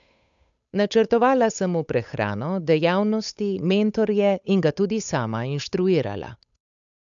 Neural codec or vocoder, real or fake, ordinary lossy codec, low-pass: codec, 16 kHz, 8 kbps, FunCodec, trained on Chinese and English, 25 frames a second; fake; none; 7.2 kHz